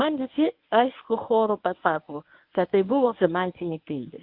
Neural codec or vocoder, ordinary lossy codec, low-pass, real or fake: codec, 24 kHz, 0.9 kbps, WavTokenizer, medium speech release version 1; AAC, 48 kbps; 5.4 kHz; fake